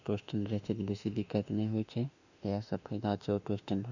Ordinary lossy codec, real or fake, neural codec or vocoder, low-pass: none; fake; autoencoder, 48 kHz, 32 numbers a frame, DAC-VAE, trained on Japanese speech; 7.2 kHz